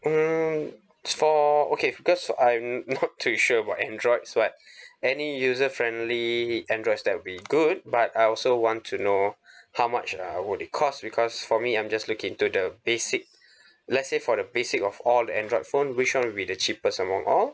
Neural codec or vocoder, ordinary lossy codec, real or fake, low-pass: none; none; real; none